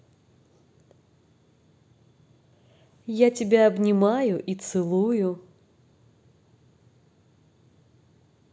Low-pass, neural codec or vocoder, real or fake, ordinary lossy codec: none; none; real; none